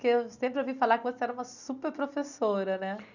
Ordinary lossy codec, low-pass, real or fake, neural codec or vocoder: none; 7.2 kHz; real; none